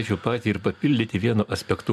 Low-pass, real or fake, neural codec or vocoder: 14.4 kHz; real; none